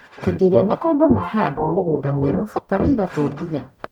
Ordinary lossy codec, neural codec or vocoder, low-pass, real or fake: none; codec, 44.1 kHz, 0.9 kbps, DAC; 19.8 kHz; fake